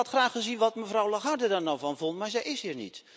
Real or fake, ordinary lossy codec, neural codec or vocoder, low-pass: real; none; none; none